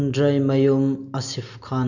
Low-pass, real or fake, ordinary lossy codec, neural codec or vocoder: 7.2 kHz; real; none; none